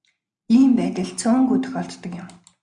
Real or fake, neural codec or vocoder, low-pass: real; none; 9.9 kHz